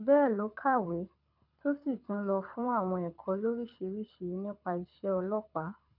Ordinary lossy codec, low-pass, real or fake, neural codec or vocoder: none; 5.4 kHz; fake; codec, 24 kHz, 6 kbps, HILCodec